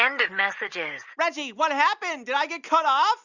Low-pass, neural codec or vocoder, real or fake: 7.2 kHz; none; real